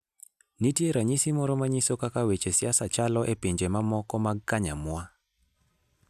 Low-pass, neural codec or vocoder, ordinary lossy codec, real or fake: 14.4 kHz; none; none; real